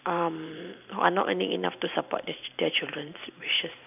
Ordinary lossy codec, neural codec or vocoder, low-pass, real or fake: none; vocoder, 44.1 kHz, 128 mel bands every 512 samples, BigVGAN v2; 3.6 kHz; fake